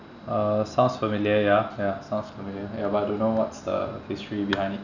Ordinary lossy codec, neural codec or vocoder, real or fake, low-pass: none; none; real; 7.2 kHz